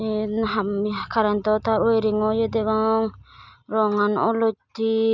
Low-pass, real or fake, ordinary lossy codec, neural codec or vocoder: 7.2 kHz; real; none; none